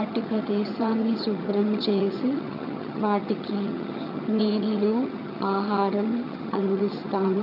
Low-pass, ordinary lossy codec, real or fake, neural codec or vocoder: 5.4 kHz; none; fake; vocoder, 22.05 kHz, 80 mel bands, HiFi-GAN